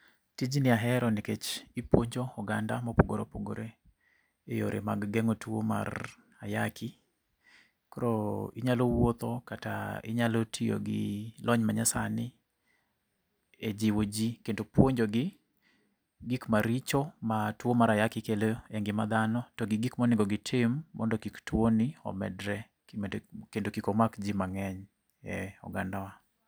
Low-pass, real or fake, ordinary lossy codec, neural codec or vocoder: none; real; none; none